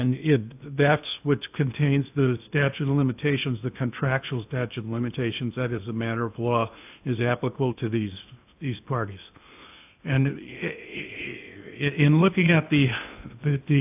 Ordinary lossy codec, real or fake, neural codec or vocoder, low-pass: AAC, 32 kbps; fake; codec, 16 kHz in and 24 kHz out, 0.8 kbps, FocalCodec, streaming, 65536 codes; 3.6 kHz